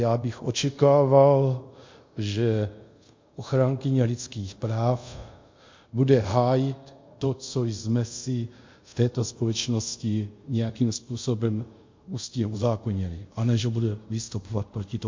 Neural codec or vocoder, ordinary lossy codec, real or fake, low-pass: codec, 24 kHz, 0.5 kbps, DualCodec; MP3, 48 kbps; fake; 7.2 kHz